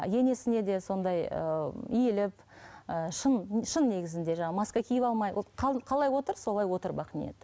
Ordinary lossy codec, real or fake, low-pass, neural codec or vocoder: none; real; none; none